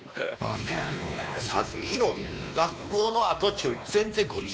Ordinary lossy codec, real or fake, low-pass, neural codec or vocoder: none; fake; none; codec, 16 kHz, 2 kbps, X-Codec, WavLM features, trained on Multilingual LibriSpeech